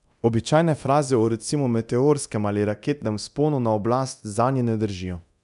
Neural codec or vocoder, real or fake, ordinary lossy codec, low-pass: codec, 24 kHz, 0.9 kbps, DualCodec; fake; none; 10.8 kHz